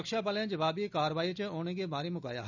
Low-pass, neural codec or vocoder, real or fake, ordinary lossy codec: 7.2 kHz; none; real; none